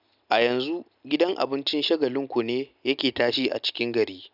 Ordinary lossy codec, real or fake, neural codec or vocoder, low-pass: none; real; none; 5.4 kHz